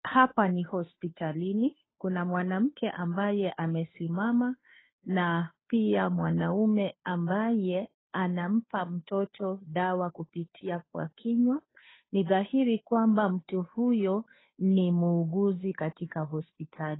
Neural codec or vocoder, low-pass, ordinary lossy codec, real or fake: codec, 16 kHz, 2 kbps, FunCodec, trained on Chinese and English, 25 frames a second; 7.2 kHz; AAC, 16 kbps; fake